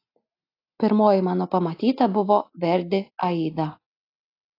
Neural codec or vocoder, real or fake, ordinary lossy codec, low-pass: none; real; AAC, 32 kbps; 5.4 kHz